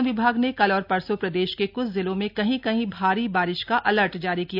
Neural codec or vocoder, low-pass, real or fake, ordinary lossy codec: none; 5.4 kHz; real; none